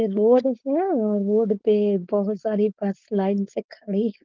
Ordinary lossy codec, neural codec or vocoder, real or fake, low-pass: Opus, 16 kbps; codec, 16 kHz, 4.8 kbps, FACodec; fake; 7.2 kHz